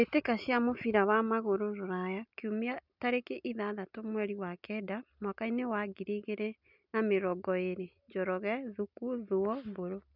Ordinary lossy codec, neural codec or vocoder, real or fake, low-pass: none; none; real; 5.4 kHz